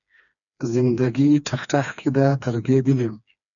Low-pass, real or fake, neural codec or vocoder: 7.2 kHz; fake; codec, 16 kHz, 2 kbps, FreqCodec, smaller model